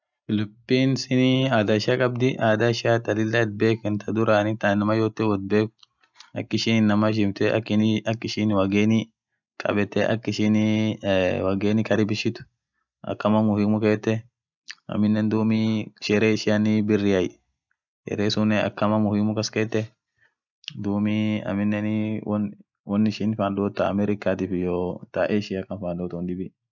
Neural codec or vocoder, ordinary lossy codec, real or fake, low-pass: none; none; real; 7.2 kHz